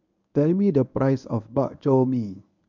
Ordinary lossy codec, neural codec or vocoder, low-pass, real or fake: none; codec, 24 kHz, 0.9 kbps, WavTokenizer, medium speech release version 1; 7.2 kHz; fake